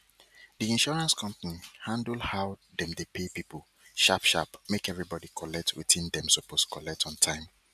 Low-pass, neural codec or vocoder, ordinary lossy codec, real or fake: 14.4 kHz; none; none; real